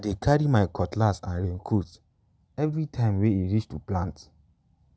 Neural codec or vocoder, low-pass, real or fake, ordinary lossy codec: none; none; real; none